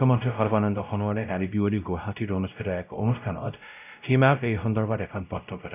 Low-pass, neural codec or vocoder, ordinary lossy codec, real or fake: 3.6 kHz; codec, 16 kHz, 0.5 kbps, X-Codec, WavLM features, trained on Multilingual LibriSpeech; none; fake